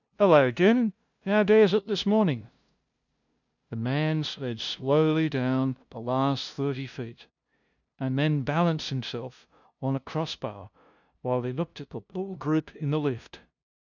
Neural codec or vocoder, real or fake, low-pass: codec, 16 kHz, 0.5 kbps, FunCodec, trained on LibriTTS, 25 frames a second; fake; 7.2 kHz